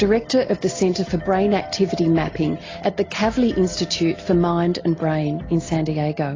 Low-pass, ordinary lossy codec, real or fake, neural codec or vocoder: 7.2 kHz; AAC, 32 kbps; real; none